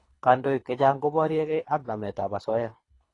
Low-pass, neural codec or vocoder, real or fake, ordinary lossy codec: 10.8 kHz; codec, 24 kHz, 3 kbps, HILCodec; fake; AAC, 32 kbps